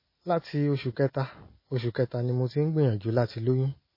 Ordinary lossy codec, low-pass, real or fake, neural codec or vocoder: MP3, 24 kbps; 5.4 kHz; real; none